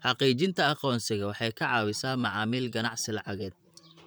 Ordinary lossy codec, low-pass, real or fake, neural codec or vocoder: none; none; fake; vocoder, 44.1 kHz, 128 mel bands, Pupu-Vocoder